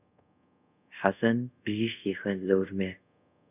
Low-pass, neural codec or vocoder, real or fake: 3.6 kHz; codec, 24 kHz, 0.5 kbps, DualCodec; fake